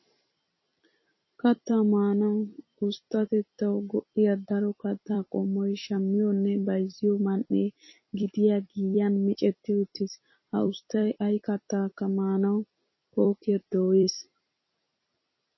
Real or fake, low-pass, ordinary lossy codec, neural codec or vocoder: fake; 7.2 kHz; MP3, 24 kbps; vocoder, 44.1 kHz, 128 mel bands every 256 samples, BigVGAN v2